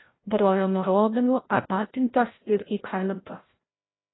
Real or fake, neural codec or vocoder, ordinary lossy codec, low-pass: fake; codec, 16 kHz, 0.5 kbps, FreqCodec, larger model; AAC, 16 kbps; 7.2 kHz